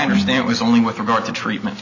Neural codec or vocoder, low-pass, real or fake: none; 7.2 kHz; real